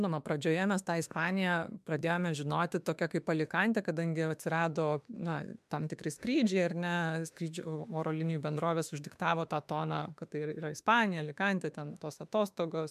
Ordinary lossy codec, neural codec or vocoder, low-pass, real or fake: MP3, 96 kbps; autoencoder, 48 kHz, 32 numbers a frame, DAC-VAE, trained on Japanese speech; 14.4 kHz; fake